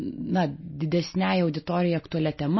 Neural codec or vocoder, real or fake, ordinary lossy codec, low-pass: none; real; MP3, 24 kbps; 7.2 kHz